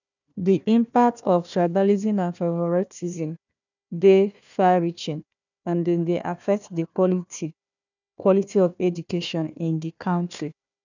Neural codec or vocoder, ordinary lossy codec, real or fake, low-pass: codec, 16 kHz, 1 kbps, FunCodec, trained on Chinese and English, 50 frames a second; none; fake; 7.2 kHz